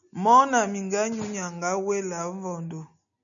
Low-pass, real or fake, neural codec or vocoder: 7.2 kHz; real; none